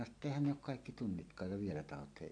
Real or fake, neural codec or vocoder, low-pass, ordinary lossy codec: real; none; 9.9 kHz; AAC, 48 kbps